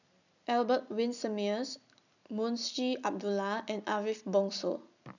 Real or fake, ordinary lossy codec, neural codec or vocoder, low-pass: real; none; none; 7.2 kHz